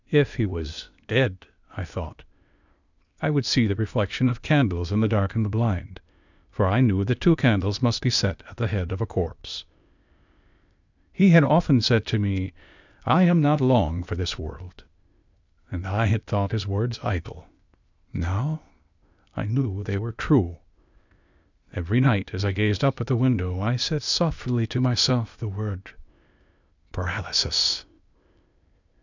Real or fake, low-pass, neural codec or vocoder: fake; 7.2 kHz; codec, 16 kHz, 0.8 kbps, ZipCodec